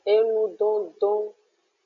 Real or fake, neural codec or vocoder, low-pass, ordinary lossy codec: real; none; 7.2 kHz; MP3, 64 kbps